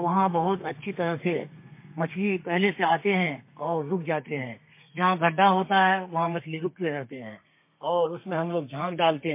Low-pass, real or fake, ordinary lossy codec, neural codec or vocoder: 3.6 kHz; fake; MP3, 24 kbps; codec, 32 kHz, 1.9 kbps, SNAC